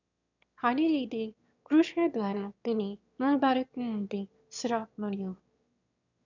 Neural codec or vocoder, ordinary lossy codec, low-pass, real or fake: autoencoder, 22.05 kHz, a latent of 192 numbers a frame, VITS, trained on one speaker; none; 7.2 kHz; fake